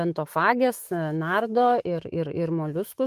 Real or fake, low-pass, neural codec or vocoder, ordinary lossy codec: fake; 14.4 kHz; autoencoder, 48 kHz, 128 numbers a frame, DAC-VAE, trained on Japanese speech; Opus, 24 kbps